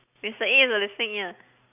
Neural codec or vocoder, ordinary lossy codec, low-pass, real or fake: none; none; 3.6 kHz; real